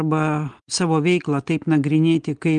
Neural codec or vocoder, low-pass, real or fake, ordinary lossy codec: none; 9.9 kHz; real; Opus, 24 kbps